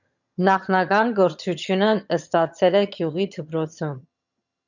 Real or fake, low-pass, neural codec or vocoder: fake; 7.2 kHz; vocoder, 22.05 kHz, 80 mel bands, HiFi-GAN